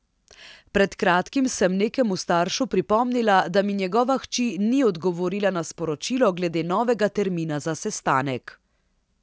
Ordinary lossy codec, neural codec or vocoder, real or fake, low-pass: none; none; real; none